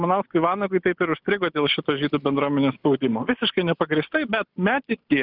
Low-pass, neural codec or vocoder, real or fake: 5.4 kHz; none; real